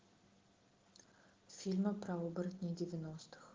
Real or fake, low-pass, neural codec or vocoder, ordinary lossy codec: real; 7.2 kHz; none; Opus, 16 kbps